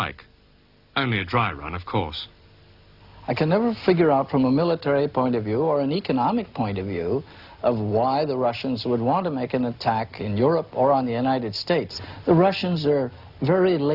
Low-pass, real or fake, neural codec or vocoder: 5.4 kHz; real; none